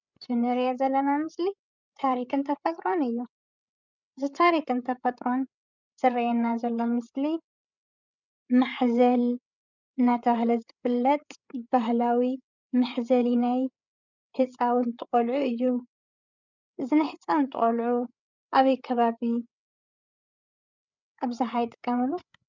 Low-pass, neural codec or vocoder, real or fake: 7.2 kHz; codec, 16 kHz, 8 kbps, FreqCodec, larger model; fake